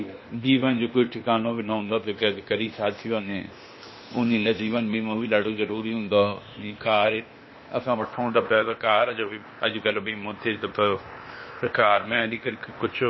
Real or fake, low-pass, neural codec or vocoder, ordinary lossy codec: fake; 7.2 kHz; codec, 16 kHz, 0.8 kbps, ZipCodec; MP3, 24 kbps